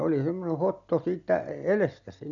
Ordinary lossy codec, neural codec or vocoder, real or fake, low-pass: none; none; real; 7.2 kHz